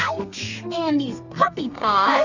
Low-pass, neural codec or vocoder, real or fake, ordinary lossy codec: 7.2 kHz; codec, 32 kHz, 1.9 kbps, SNAC; fake; Opus, 64 kbps